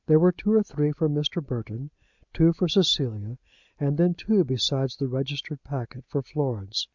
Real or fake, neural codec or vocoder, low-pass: real; none; 7.2 kHz